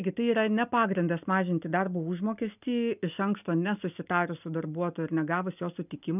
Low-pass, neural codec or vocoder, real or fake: 3.6 kHz; none; real